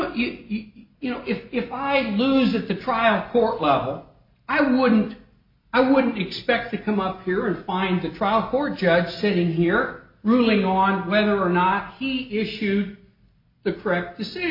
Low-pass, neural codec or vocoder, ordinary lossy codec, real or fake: 5.4 kHz; none; MP3, 32 kbps; real